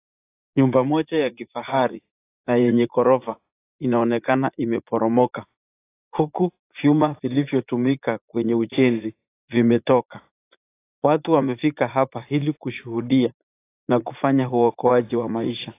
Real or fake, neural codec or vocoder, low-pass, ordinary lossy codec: fake; vocoder, 44.1 kHz, 80 mel bands, Vocos; 3.6 kHz; AAC, 24 kbps